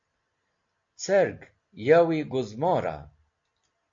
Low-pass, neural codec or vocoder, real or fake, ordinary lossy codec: 7.2 kHz; none; real; AAC, 48 kbps